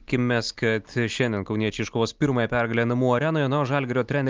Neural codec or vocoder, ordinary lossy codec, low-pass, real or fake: none; Opus, 24 kbps; 7.2 kHz; real